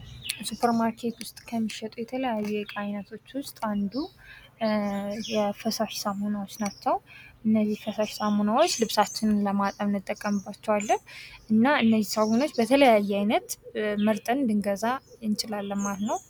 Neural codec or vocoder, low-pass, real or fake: none; 19.8 kHz; real